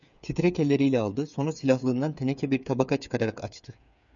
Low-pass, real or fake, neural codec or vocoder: 7.2 kHz; fake; codec, 16 kHz, 8 kbps, FreqCodec, smaller model